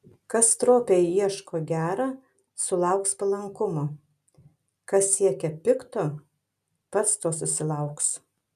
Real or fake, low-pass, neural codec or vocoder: real; 14.4 kHz; none